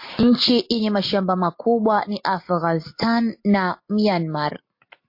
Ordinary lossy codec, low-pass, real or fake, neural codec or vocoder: MP3, 32 kbps; 5.4 kHz; real; none